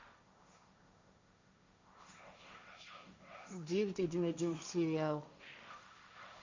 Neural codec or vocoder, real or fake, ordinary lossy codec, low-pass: codec, 16 kHz, 1.1 kbps, Voila-Tokenizer; fake; MP3, 64 kbps; 7.2 kHz